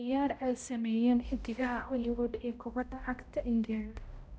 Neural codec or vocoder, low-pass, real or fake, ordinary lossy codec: codec, 16 kHz, 0.5 kbps, X-Codec, HuBERT features, trained on balanced general audio; none; fake; none